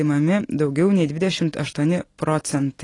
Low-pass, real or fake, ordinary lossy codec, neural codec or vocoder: 10.8 kHz; real; AAC, 32 kbps; none